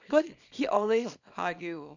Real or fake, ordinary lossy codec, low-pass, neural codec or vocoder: fake; none; 7.2 kHz; codec, 24 kHz, 0.9 kbps, WavTokenizer, small release